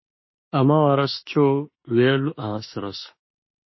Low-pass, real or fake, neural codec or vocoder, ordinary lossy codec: 7.2 kHz; fake; autoencoder, 48 kHz, 32 numbers a frame, DAC-VAE, trained on Japanese speech; MP3, 24 kbps